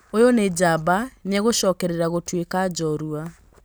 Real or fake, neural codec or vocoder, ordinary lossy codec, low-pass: real; none; none; none